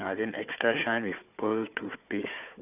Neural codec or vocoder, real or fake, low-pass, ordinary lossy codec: codec, 16 kHz, 4 kbps, FunCodec, trained on Chinese and English, 50 frames a second; fake; 3.6 kHz; none